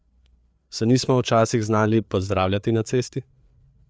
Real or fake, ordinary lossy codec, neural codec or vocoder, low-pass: fake; none; codec, 16 kHz, 4 kbps, FreqCodec, larger model; none